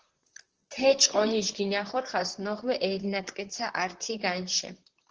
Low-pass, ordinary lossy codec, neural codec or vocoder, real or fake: 7.2 kHz; Opus, 16 kbps; vocoder, 44.1 kHz, 128 mel bands, Pupu-Vocoder; fake